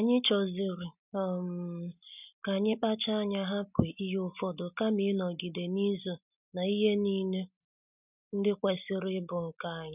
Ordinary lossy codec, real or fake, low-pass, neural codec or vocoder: none; real; 3.6 kHz; none